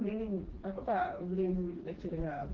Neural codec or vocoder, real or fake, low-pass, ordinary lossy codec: codec, 16 kHz, 2 kbps, FreqCodec, smaller model; fake; 7.2 kHz; Opus, 16 kbps